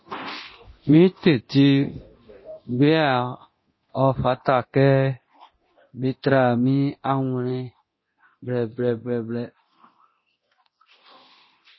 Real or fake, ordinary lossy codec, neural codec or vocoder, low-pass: fake; MP3, 24 kbps; codec, 24 kHz, 0.9 kbps, DualCodec; 7.2 kHz